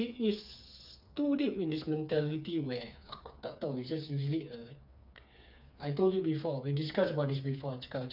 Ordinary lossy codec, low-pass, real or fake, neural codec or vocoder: none; 5.4 kHz; fake; codec, 16 kHz, 4 kbps, FreqCodec, smaller model